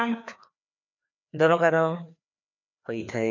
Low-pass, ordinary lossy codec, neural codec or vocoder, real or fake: 7.2 kHz; none; codec, 16 kHz, 2 kbps, FreqCodec, larger model; fake